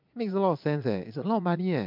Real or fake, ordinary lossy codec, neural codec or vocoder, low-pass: fake; MP3, 48 kbps; vocoder, 22.05 kHz, 80 mel bands, Vocos; 5.4 kHz